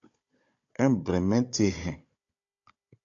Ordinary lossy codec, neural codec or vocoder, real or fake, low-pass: AAC, 48 kbps; codec, 16 kHz, 16 kbps, FunCodec, trained on Chinese and English, 50 frames a second; fake; 7.2 kHz